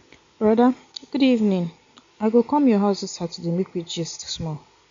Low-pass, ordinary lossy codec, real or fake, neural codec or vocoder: 7.2 kHz; MP3, 64 kbps; real; none